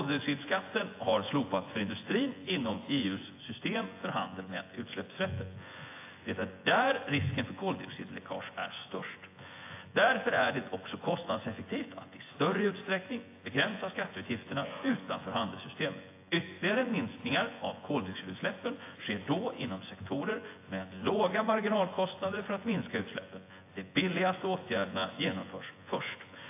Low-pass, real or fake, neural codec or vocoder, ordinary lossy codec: 3.6 kHz; fake; vocoder, 24 kHz, 100 mel bands, Vocos; AAC, 24 kbps